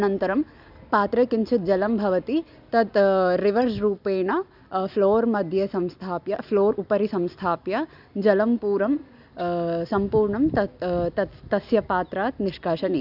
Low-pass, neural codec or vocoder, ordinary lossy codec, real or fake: 5.4 kHz; none; none; real